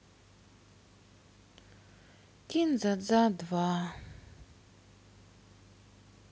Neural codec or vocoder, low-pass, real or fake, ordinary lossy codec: none; none; real; none